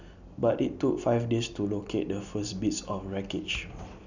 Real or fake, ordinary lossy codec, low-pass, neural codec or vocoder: real; none; 7.2 kHz; none